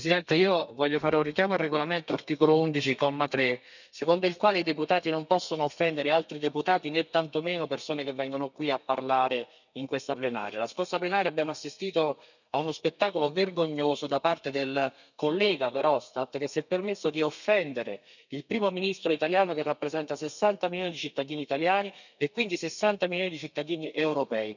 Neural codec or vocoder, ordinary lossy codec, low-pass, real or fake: codec, 32 kHz, 1.9 kbps, SNAC; none; 7.2 kHz; fake